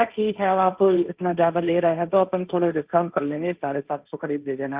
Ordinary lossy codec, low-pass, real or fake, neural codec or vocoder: Opus, 24 kbps; 3.6 kHz; fake; codec, 16 kHz, 1.1 kbps, Voila-Tokenizer